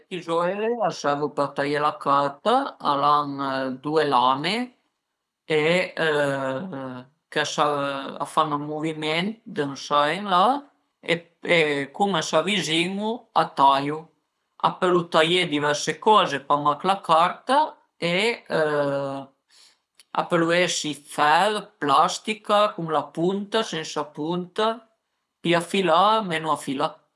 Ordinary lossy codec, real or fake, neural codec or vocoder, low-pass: none; fake; codec, 24 kHz, 6 kbps, HILCodec; none